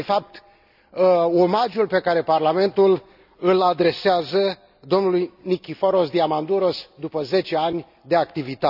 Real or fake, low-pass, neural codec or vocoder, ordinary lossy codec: real; 5.4 kHz; none; none